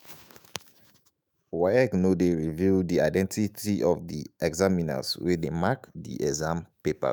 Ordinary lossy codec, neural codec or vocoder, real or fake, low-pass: none; autoencoder, 48 kHz, 128 numbers a frame, DAC-VAE, trained on Japanese speech; fake; none